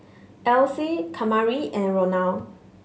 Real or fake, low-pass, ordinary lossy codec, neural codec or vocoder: real; none; none; none